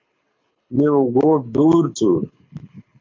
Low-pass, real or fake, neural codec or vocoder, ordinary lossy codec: 7.2 kHz; fake; codec, 24 kHz, 6 kbps, HILCodec; MP3, 48 kbps